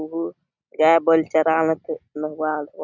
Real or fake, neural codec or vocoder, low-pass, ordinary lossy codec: real; none; 7.2 kHz; none